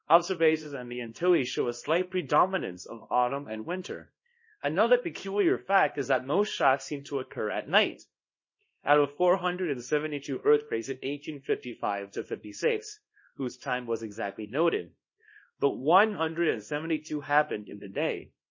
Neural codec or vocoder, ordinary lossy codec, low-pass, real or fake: codec, 24 kHz, 0.9 kbps, WavTokenizer, small release; MP3, 32 kbps; 7.2 kHz; fake